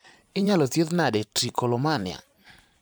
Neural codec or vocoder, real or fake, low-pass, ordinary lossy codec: vocoder, 44.1 kHz, 128 mel bands every 512 samples, BigVGAN v2; fake; none; none